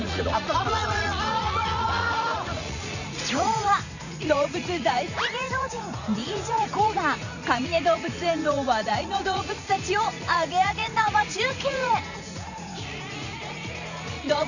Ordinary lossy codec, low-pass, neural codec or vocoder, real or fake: none; 7.2 kHz; vocoder, 44.1 kHz, 80 mel bands, Vocos; fake